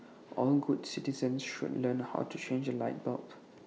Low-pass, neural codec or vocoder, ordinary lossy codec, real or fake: none; none; none; real